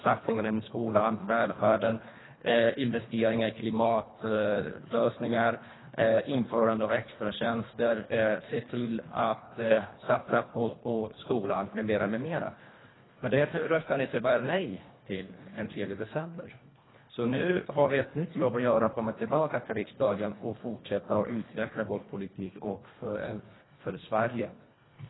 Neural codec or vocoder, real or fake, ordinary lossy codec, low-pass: codec, 24 kHz, 1.5 kbps, HILCodec; fake; AAC, 16 kbps; 7.2 kHz